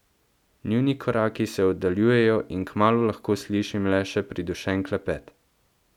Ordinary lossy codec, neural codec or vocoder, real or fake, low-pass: none; none; real; 19.8 kHz